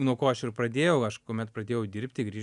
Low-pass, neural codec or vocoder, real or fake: 10.8 kHz; none; real